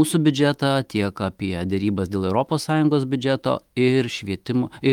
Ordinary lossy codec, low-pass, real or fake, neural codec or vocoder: Opus, 32 kbps; 19.8 kHz; fake; autoencoder, 48 kHz, 128 numbers a frame, DAC-VAE, trained on Japanese speech